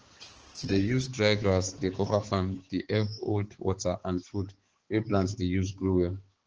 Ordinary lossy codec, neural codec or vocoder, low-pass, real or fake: Opus, 16 kbps; codec, 16 kHz, 4 kbps, X-Codec, HuBERT features, trained on balanced general audio; 7.2 kHz; fake